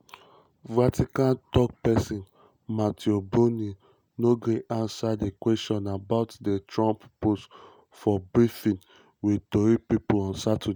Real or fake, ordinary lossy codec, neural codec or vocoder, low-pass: real; none; none; none